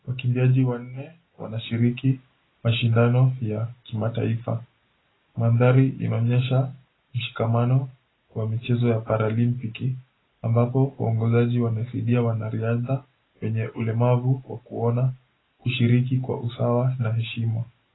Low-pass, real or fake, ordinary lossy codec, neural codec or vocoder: 7.2 kHz; real; AAC, 16 kbps; none